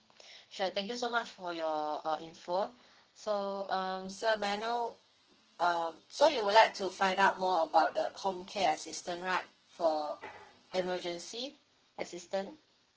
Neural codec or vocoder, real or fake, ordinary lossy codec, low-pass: codec, 32 kHz, 1.9 kbps, SNAC; fake; Opus, 16 kbps; 7.2 kHz